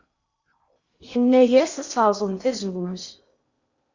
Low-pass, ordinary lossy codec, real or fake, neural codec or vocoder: 7.2 kHz; Opus, 64 kbps; fake; codec, 16 kHz in and 24 kHz out, 0.6 kbps, FocalCodec, streaming, 2048 codes